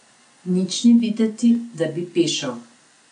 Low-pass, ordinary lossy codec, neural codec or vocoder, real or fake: 9.9 kHz; none; none; real